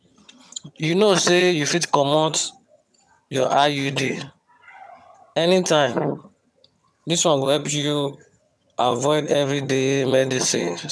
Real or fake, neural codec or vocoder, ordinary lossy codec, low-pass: fake; vocoder, 22.05 kHz, 80 mel bands, HiFi-GAN; none; none